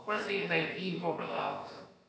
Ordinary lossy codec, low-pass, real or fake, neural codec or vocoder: none; none; fake; codec, 16 kHz, about 1 kbps, DyCAST, with the encoder's durations